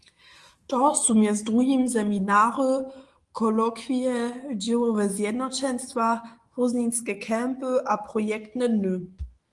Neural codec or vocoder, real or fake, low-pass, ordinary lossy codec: autoencoder, 48 kHz, 128 numbers a frame, DAC-VAE, trained on Japanese speech; fake; 10.8 kHz; Opus, 24 kbps